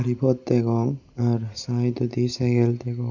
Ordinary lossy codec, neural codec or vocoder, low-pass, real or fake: none; none; 7.2 kHz; real